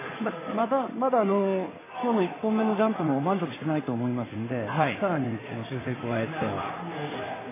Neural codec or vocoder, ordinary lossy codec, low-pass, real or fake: codec, 16 kHz in and 24 kHz out, 2.2 kbps, FireRedTTS-2 codec; MP3, 16 kbps; 3.6 kHz; fake